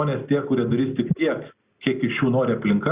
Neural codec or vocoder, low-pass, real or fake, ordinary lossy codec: none; 3.6 kHz; real; Opus, 64 kbps